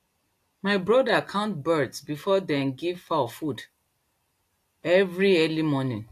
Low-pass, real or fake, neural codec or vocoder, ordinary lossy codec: 14.4 kHz; real; none; AAC, 64 kbps